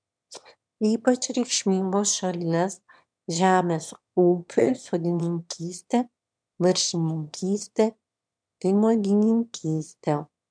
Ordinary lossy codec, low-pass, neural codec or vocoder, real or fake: MP3, 96 kbps; 9.9 kHz; autoencoder, 22.05 kHz, a latent of 192 numbers a frame, VITS, trained on one speaker; fake